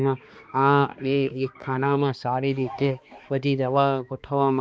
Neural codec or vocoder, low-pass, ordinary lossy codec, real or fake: codec, 16 kHz, 2 kbps, X-Codec, HuBERT features, trained on balanced general audio; none; none; fake